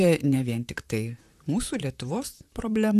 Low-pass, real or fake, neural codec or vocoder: 14.4 kHz; fake; vocoder, 44.1 kHz, 128 mel bands, Pupu-Vocoder